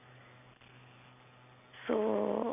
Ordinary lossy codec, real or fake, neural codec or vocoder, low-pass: none; real; none; 3.6 kHz